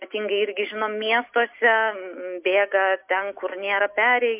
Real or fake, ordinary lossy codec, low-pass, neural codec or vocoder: real; MP3, 32 kbps; 3.6 kHz; none